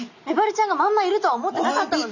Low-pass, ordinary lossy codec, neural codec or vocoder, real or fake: 7.2 kHz; none; none; real